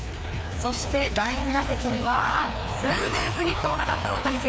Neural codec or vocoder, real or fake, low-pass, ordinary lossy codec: codec, 16 kHz, 2 kbps, FreqCodec, larger model; fake; none; none